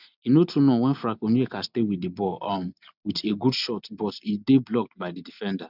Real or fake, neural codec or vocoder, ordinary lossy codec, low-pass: real; none; none; 5.4 kHz